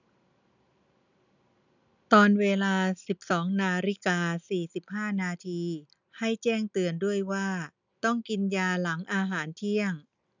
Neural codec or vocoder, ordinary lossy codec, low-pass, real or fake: none; none; 7.2 kHz; real